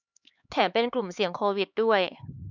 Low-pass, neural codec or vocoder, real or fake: 7.2 kHz; codec, 16 kHz, 4 kbps, X-Codec, HuBERT features, trained on LibriSpeech; fake